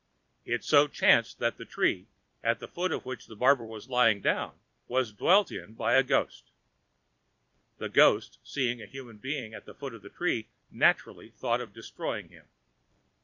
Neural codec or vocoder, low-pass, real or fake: vocoder, 44.1 kHz, 128 mel bands every 256 samples, BigVGAN v2; 7.2 kHz; fake